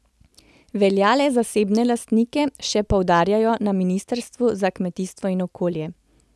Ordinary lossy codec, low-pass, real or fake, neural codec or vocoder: none; none; real; none